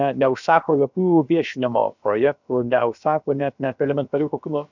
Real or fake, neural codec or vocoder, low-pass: fake; codec, 16 kHz, about 1 kbps, DyCAST, with the encoder's durations; 7.2 kHz